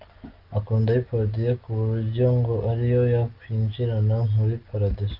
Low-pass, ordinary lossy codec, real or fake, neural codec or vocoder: 5.4 kHz; Opus, 32 kbps; real; none